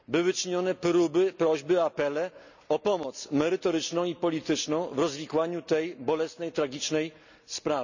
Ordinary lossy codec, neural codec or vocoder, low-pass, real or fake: none; none; 7.2 kHz; real